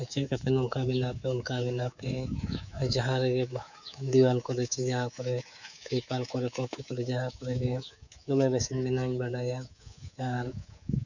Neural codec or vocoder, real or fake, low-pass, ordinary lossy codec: codec, 24 kHz, 3.1 kbps, DualCodec; fake; 7.2 kHz; none